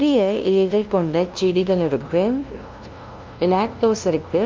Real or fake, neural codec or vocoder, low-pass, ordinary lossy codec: fake; codec, 16 kHz, 0.5 kbps, FunCodec, trained on LibriTTS, 25 frames a second; 7.2 kHz; Opus, 24 kbps